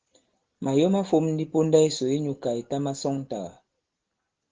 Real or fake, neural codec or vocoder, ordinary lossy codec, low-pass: real; none; Opus, 16 kbps; 7.2 kHz